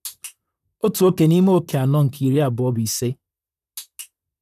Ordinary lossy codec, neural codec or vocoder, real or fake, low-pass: none; vocoder, 44.1 kHz, 128 mel bands, Pupu-Vocoder; fake; 14.4 kHz